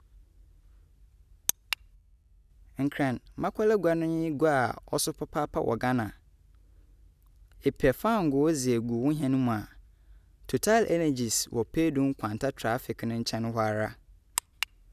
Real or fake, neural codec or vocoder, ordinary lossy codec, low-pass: real; none; none; 14.4 kHz